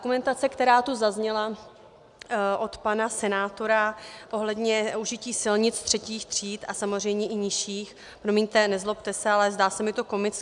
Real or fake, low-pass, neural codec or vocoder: real; 10.8 kHz; none